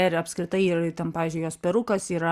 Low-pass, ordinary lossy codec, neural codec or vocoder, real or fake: 14.4 kHz; Opus, 64 kbps; none; real